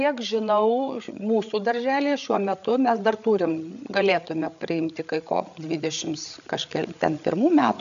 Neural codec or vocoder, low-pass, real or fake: codec, 16 kHz, 8 kbps, FreqCodec, larger model; 7.2 kHz; fake